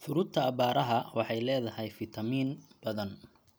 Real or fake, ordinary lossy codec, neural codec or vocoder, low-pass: real; none; none; none